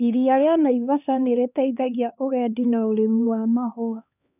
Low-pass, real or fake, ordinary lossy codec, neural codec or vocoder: 3.6 kHz; fake; none; codec, 16 kHz, 2 kbps, X-Codec, HuBERT features, trained on LibriSpeech